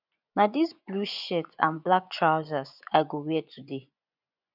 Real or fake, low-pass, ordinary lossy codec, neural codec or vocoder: fake; 5.4 kHz; none; vocoder, 24 kHz, 100 mel bands, Vocos